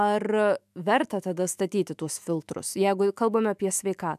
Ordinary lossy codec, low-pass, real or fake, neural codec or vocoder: MP3, 96 kbps; 14.4 kHz; fake; autoencoder, 48 kHz, 128 numbers a frame, DAC-VAE, trained on Japanese speech